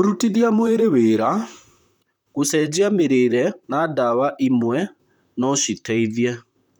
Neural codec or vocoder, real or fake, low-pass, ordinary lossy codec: vocoder, 44.1 kHz, 128 mel bands, Pupu-Vocoder; fake; 19.8 kHz; none